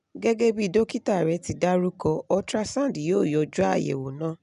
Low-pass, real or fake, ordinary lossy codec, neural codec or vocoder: 10.8 kHz; real; none; none